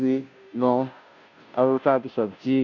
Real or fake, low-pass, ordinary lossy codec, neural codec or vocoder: fake; 7.2 kHz; none; codec, 16 kHz, 0.5 kbps, FunCodec, trained on Chinese and English, 25 frames a second